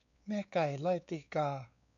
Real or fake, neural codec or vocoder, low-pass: fake; codec, 16 kHz, 4 kbps, X-Codec, WavLM features, trained on Multilingual LibriSpeech; 7.2 kHz